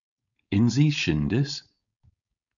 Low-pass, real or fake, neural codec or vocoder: 7.2 kHz; fake; codec, 16 kHz, 4.8 kbps, FACodec